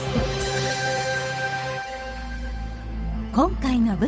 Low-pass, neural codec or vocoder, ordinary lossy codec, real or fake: none; codec, 16 kHz, 8 kbps, FunCodec, trained on Chinese and English, 25 frames a second; none; fake